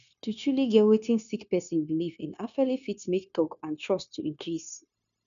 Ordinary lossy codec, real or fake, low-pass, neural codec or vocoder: none; fake; 7.2 kHz; codec, 16 kHz, 0.9 kbps, LongCat-Audio-Codec